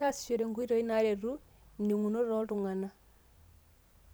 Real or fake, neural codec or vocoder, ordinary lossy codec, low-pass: fake; vocoder, 44.1 kHz, 128 mel bands every 512 samples, BigVGAN v2; none; none